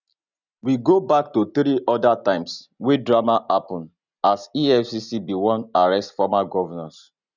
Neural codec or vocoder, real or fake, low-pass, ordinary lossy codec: none; real; 7.2 kHz; none